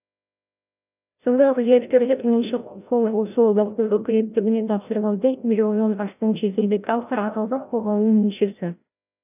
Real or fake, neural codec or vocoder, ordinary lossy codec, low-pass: fake; codec, 16 kHz, 0.5 kbps, FreqCodec, larger model; none; 3.6 kHz